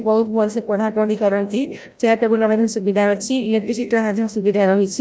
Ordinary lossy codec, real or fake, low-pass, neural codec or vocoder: none; fake; none; codec, 16 kHz, 0.5 kbps, FreqCodec, larger model